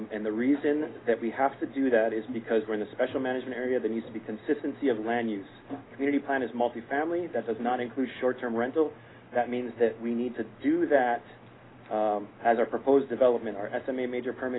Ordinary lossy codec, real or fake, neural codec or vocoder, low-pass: AAC, 16 kbps; real; none; 7.2 kHz